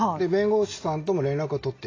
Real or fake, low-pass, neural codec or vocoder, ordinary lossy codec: real; 7.2 kHz; none; AAC, 32 kbps